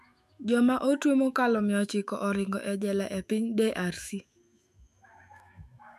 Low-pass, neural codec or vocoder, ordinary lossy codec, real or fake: 14.4 kHz; autoencoder, 48 kHz, 128 numbers a frame, DAC-VAE, trained on Japanese speech; none; fake